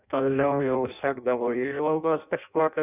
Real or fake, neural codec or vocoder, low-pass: fake; codec, 16 kHz in and 24 kHz out, 0.6 kbps, FireRedTTS-2 codec; 3.6 kHz